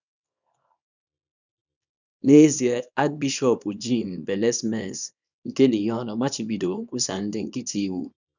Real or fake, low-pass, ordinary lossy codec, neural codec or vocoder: fake; 7.2 kHz; none; codec, 24 kHz, 0.9 kbps, WavTokenizer, small release